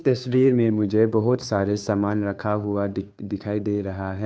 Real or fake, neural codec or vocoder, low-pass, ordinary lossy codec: fake; codec, 16 kHz, 2 kbps, FunCodec, trained on Chinese and English, 25 frames a second; none; none